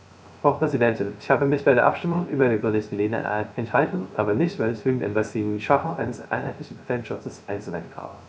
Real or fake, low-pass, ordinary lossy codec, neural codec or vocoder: fake; none; none; codec, 16 kHz, 0.3 kbps, FocalCodec